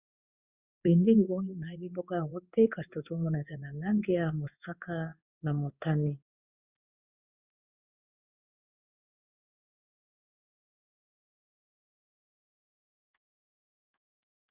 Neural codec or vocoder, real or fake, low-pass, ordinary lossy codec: codec, 16 kHz in and 24 kHz out, 1 kbps, XY-Tokenizer; fake; 3.6 kHz; Opus, 64 kbps